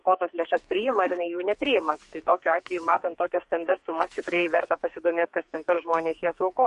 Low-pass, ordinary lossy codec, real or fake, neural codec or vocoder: 14.4 kHz; MP3, 48 kbps; fake; codec, 44.1 kHz, 2.6 kbps, SNAC